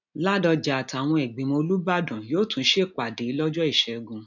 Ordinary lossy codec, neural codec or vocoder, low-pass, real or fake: none; none; 7.2 kHz; real